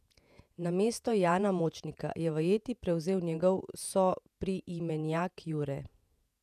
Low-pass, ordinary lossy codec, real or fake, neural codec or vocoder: 14.4 kHz; none; fake; vocoder, 48 kHz, 128 mel bands, Vocos